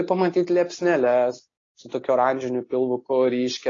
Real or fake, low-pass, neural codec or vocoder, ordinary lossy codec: real; 7.2 kHz; none; AAC, 32 kbps